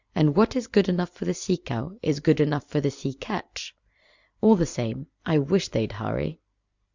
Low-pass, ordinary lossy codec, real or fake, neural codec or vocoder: 7.2 kHz; Opus, 64 kbps; fake; vocoder, 44.1 kHz, 128 mel bands every 256 samples, BigVGAN v2